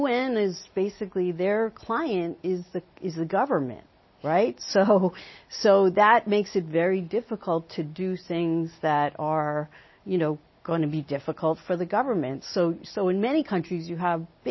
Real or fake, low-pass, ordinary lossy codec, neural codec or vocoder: real; 7.2 kHz; MP3, 24 kbps; none